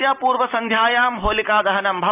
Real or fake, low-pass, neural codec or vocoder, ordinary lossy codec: real; 3.6 kHz; none; none